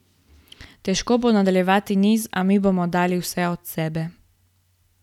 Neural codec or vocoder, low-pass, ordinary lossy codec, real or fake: none; 19.8 kHz; none; real